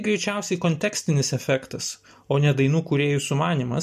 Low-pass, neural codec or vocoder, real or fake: 14.4 kHz; none; real